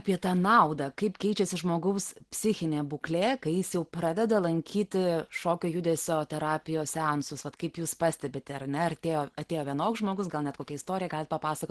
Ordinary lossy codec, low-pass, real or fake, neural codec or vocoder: Opus, 16 kbps; 10.8 kHz; real; none